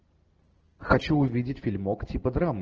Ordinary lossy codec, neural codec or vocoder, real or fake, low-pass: Opus, 16 kbps; none; real; 7.2 kHz